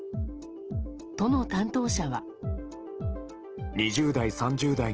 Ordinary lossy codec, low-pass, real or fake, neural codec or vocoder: Opus, 16 kbps; 7.2 kHz; real; none